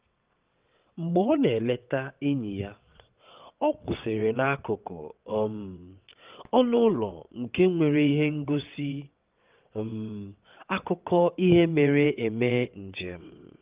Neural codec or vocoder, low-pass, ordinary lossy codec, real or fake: vocoder, 22.05 kHz, 80 mel bands, WaveNeXt; 3.6 kHz; Opus, 24 kbps; fake